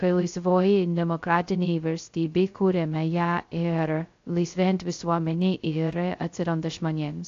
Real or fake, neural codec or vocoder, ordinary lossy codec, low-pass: fake; codec, 16 kHz, 0.2 kbps, FocalCodec; AAC, 48 kbps; 7.2 kHz